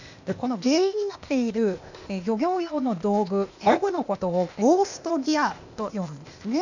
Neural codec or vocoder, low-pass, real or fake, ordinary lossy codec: codec, 16 kHz, 0.8 kbps, ZipCodec; 7.2 kHz; fake; none